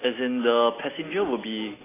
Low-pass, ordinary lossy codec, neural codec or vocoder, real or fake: 3.6 kHz; AAC, 16 kbps; none; real